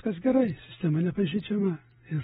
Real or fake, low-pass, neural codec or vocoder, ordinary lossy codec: real; 19.8 kHz; none; AAC, 16 kbps